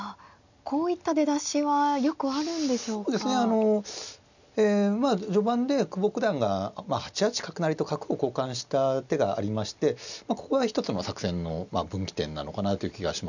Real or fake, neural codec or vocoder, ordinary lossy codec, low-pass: real; none; none; 7.2 kHz